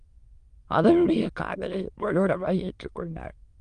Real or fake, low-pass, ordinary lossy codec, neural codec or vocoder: fake; 9.9 kHz; Opus, 24 kbps; autoencoder, 22.05 kHz, a latent of 192 numbers a frame, VITS, trained on many speakers